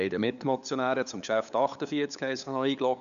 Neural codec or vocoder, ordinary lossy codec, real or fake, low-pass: codec, 16 kHz, 8 kbps, FunCodec, trained on LibriTTS, 25 frames a second; none; fake; 7.2 kHz